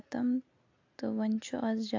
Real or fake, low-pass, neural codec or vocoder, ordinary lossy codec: real; 7.2 kHz; none; AAC, 48 kbps